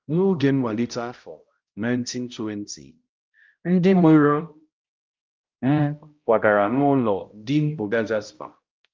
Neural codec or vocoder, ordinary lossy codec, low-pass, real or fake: codec, 16 kHz, 0.5 kbps, X-Codec, HuBERT features, trained on balanced general audio; Opus, 24 kbps; 7.2 kHz; fake